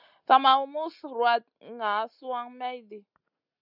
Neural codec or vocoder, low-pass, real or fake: none; 5.4 kHz; real